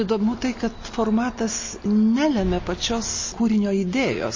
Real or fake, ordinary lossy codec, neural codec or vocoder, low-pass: real; MP3, 32 kbps; none; 7.2 kHz